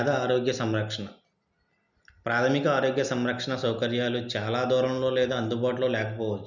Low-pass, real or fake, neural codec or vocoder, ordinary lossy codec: 7.2 kHz; real; none; none